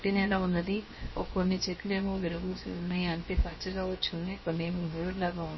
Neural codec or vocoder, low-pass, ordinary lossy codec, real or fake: codec, 16 kHz, 0.7 kbps, FocalCodec; 7.2 kHz; MP3, 24 kbps; fake